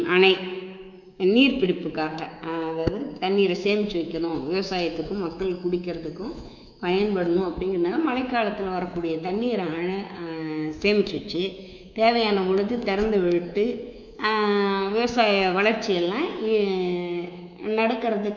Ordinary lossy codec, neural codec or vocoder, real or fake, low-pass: none; codec, 24 kHz, 3.1 kbps, DualCodec; fake; 7.2 kHz